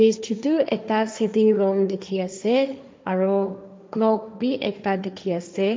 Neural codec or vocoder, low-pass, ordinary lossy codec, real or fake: codec, 16 kHz, 1.1 kbps, Voila-Tokenizer; none; none; fake